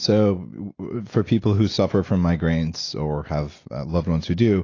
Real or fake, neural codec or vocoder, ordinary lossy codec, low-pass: real; none; AAC, 32 kbps; 7.2 kHz